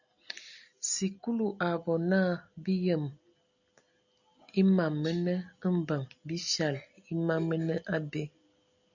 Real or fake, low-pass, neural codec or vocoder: real; 7.2 kHz; none